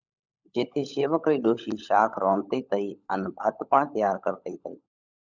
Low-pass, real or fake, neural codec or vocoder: 7.2 kHz; fake; codec, 16 kHz, 16 kbps, FunCodec, trained on LibriTTS, 50 frames a second